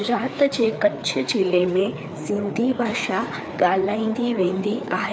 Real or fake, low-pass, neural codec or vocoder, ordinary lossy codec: fake; none; codec, 16 kHz, 4 kbps, FreqCodec, larger model; none